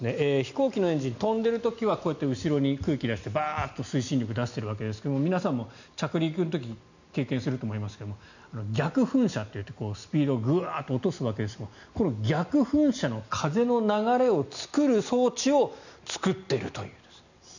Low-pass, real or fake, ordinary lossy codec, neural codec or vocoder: 7.2 kHz; real; none; none